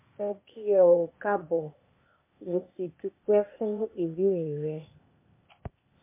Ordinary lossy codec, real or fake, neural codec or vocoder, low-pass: MP3, 24 kbps; fake; codec, 16 kHz, 0.8 kbps, ZipCodec; 3.6 kHz